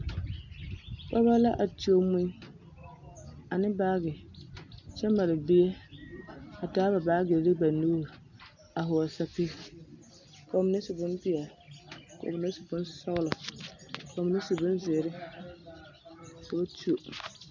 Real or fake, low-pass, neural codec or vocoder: real; 7.2 kHz; none